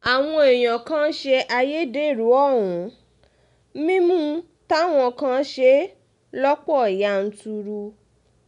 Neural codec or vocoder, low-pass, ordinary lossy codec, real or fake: none; 10.8 kHz; none; real